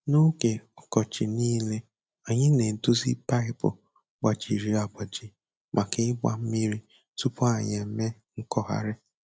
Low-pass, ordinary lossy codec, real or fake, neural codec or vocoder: none; none; real; none